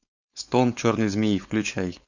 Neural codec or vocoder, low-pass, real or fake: codec, 16 kHz, 4.8 kbps, FACodec; 7.2 kHz; fake